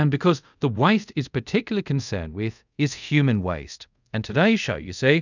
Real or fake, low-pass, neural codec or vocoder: fake; 7.2 kHz; codec, 24 kHz, 0.5 kbps, DualCodec